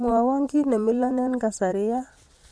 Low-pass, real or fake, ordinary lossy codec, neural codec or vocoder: none; fake; none; vocoder, 22.05 kHz, 80 mel bands, Vocos